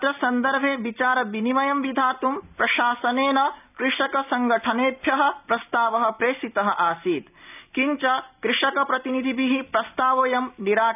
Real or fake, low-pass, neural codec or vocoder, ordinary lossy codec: real; 3.6 kHz; none; none